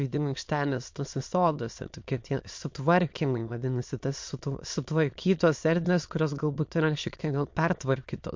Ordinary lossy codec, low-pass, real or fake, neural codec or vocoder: MP3, 48 kbps; 7.2 kHz; fake; autoencoder, 22.05 kHz, a latent of 192 numbers a frame, VITS, trained on many speakers